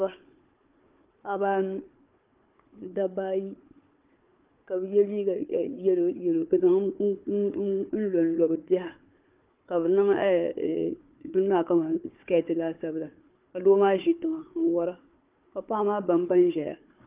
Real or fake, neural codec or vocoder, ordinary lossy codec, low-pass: fake; codec, 16 kHz, 4 kbps, FunCodec, trained on Chinese and English, 50 frames a second; Opus, 24 kbps; 3.6 kHz